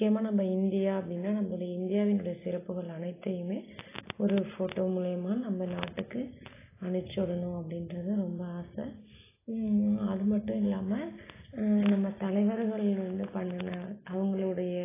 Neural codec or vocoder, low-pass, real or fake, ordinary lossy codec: none; 3.6 kHz; real; AAC, 16 kbps